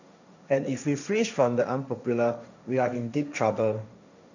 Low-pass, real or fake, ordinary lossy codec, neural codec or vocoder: 7.2 kHz; fake; none; codec, 16 kHz, 1.1 kbps, Voila-Tokenizer